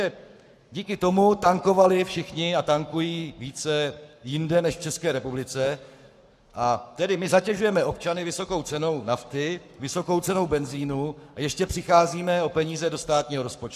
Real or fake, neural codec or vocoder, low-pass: fake; codec, 44.1 kHz, 7.8 kbps, Pupu-Codec; 14.4 kHz